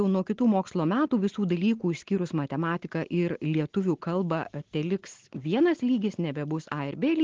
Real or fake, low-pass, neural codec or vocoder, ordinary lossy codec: real; 7.2 kHz; none; Opus, 16 kbps